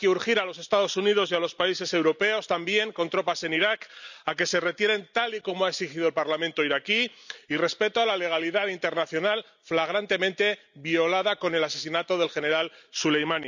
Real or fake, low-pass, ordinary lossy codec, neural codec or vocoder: real; 7.2 kHz; none; none